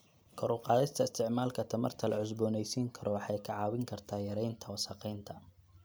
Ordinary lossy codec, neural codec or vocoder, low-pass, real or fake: none; none; none; real